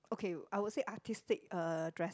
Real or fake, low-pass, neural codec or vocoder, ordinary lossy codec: real; none; none; none